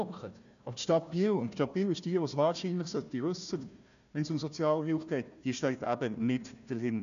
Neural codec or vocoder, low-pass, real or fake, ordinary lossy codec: codec, 16 kHz, 1 kbps, FunCodec, trained on Chinese and English, 50 frames a second; 7.2 kHz; fake; none